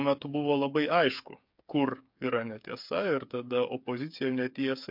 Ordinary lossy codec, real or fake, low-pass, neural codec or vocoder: MP3, 48 kbps; fake; 5.4 kHz; codec, 16 kHz, 8 kbps, FreqCodec, smaller model